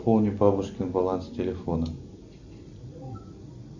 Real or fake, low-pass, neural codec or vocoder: real; 7.2 kHz; none